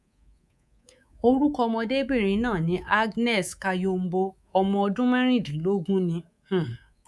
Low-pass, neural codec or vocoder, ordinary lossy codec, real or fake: none; codec, 24 kHz, 3.1 kbps, DualCodec; none; fake